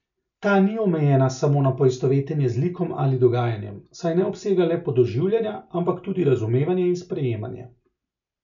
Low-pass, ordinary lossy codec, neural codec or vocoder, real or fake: 7.2 kHz; none; none; real